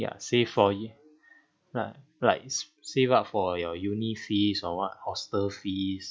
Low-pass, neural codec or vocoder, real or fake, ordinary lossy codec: none; none; real; none